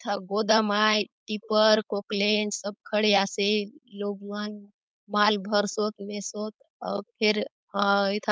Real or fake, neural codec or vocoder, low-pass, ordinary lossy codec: fake; codec, 16 kHz, 4.8 kbps, FACodec; none; none